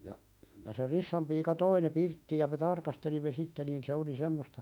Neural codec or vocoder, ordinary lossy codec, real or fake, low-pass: autoencoder, 48 kHz, 32 numbers a frame, DAC-VAE, trained on Japanese speech; none; fake; 19.8 kHz